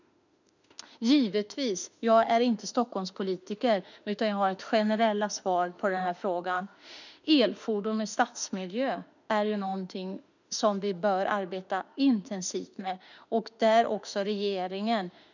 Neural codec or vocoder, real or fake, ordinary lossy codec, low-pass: autoencoder, 48 kHz, 32 numbers a frame, DAC-VAE, trained on Japanese speech; fake; none; 7.2 kHz